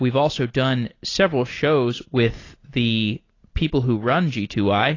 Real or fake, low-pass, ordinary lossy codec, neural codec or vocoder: real; 7.2 kHz; AAC, 32 kbps; none